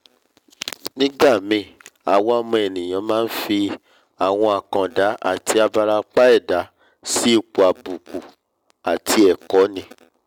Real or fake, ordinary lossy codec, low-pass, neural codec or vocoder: real; none; 19.8 kHz; none